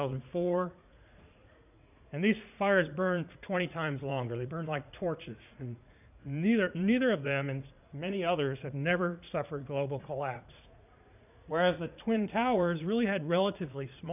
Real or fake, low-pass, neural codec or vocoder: fake; 3.6 kHz; vocoder, 44.1 kHz, 80 mel bands, Vocos